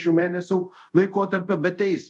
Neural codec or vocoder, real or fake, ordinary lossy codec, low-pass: codec, 24 kHz, 0.5 kbps, DualCodec; fake; MP3, 96 kbps; 10.8 kHz